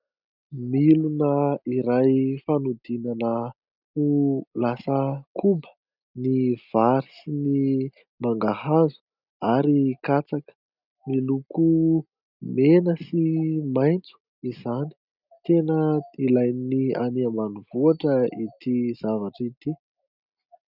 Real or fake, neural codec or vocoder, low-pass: real; none; 5.4 kHz